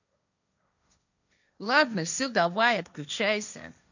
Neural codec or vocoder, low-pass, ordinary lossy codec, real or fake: codec, 16 kHz, 1.1 kbps, Voila-Tokenizer; 7.2 kHz; none; fake